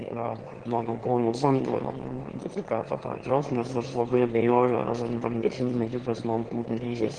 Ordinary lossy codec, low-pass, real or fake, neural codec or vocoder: Opus, 16 kbps; 9.9 kHz; fake; autoencoder, 22.05 kHz, a latent of 192 numbers a frame, VITS, trained on one speaker